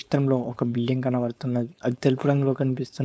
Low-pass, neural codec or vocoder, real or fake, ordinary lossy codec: none; codec, 16 kHz, 4.8 kbps, FACodec; fake; none